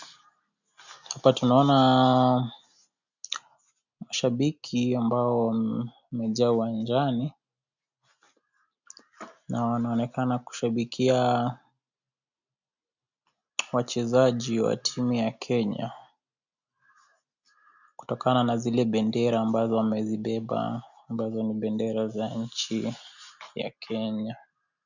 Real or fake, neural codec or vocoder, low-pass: real; none; 7.2 kHz